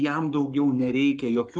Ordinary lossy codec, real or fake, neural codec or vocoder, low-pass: Opus, 32 kbps; fake; codec, 44.1 kHz, 7.8 kbps, Pupu-Codec; 9.9 kHz